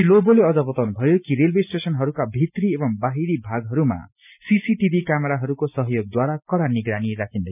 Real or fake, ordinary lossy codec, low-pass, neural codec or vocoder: real; none; 3.6 kHz; none